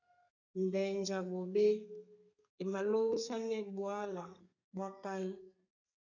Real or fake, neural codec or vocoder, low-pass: fake; codec, 32 kHz, 1.9 kbps, SNAC; 7.2 kHz